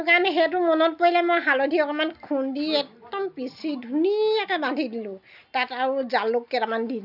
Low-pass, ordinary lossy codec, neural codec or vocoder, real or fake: 5.4 kHz; none; none; real